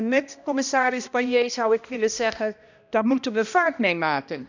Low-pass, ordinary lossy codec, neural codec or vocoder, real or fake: 7.2 kHz; none; codec, 16 kHz, 1 kbps, X-Codec, HuBERT features, trained on balanced general audio; fake